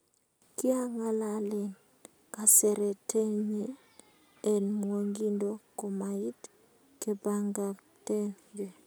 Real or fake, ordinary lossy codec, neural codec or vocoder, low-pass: real; none; none; none